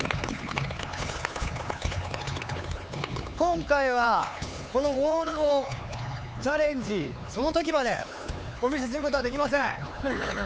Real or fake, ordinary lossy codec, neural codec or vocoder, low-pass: fake; none; codec, 16 kHz, 4 kbps, X-Codec, HuBERT features, trained on LibriSpeech; none